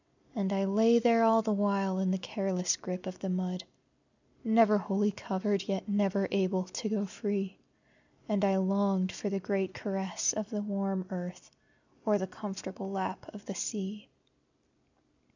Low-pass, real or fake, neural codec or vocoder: 7.2 kHz; real; none